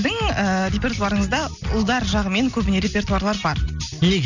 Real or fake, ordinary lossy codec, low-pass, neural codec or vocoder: real; none; 7.2 kHz; none